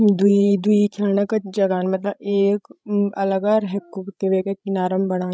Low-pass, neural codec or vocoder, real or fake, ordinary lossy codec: none; codec, 16 kHz, 16 kbps, FreqCodec, larger model; fake; none